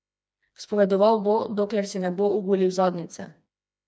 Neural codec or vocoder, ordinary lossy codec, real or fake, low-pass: codec, 16 kHz, 2 kbps, FreqCodec, smaller model; none; fake; none